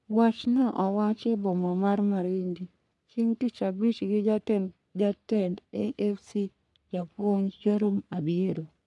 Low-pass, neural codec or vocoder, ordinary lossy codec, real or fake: 10.8 kHz; codec, 44.1 kHz, 3.4 kbps, Pupu-Codec; none; fake